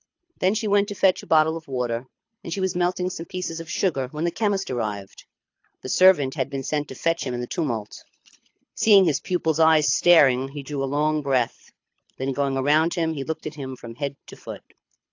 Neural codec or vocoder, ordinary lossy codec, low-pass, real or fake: codec, 24 kHz, 6 kbps, HILCodec; AAC, 48 kbps; 7.2 kHz; fake